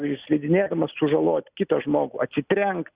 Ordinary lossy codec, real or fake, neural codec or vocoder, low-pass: Opus, 64 kbps; real; none; 3.6 kHz